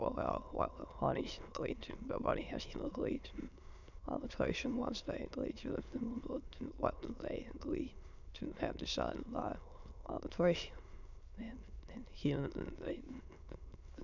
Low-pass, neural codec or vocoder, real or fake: 7.2 kHz; autoencoder, 22.05 kHz, a latent of 192 numbers a frame, VITS, trained on many speakers; fake